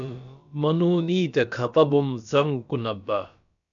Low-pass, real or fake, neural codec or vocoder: 7.2 kHz; fake; codec, 16 kHz, about 1 kbps, DyCAST, with the encoder's durations